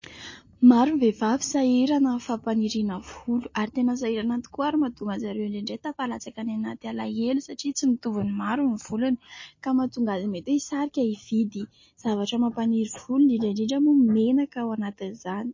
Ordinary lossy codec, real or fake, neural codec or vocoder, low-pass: MP3, 32 kbps; real; none; 7.2 kHz